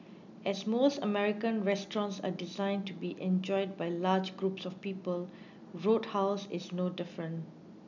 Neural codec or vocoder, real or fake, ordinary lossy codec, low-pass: none; real; none; 7.2 kHz